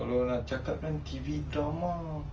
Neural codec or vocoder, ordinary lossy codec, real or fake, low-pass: none; Opus, 24 kbps; real; 7.2 kHz